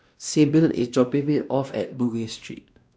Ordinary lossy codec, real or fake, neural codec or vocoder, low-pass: none; fake; codec, 16 kHz, 1 kbps, X-Codec, WavLM features, trained on Multilingual LibriSpeech; none